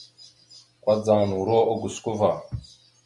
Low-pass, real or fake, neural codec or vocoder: 10.8 kHz; real; none